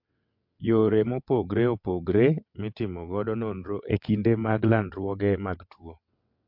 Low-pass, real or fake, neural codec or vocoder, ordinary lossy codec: 5.4 kHz; fake; vocoder, 22.05 kHz, 80 mel bands, WaveNeXt; MP3, 48 kbps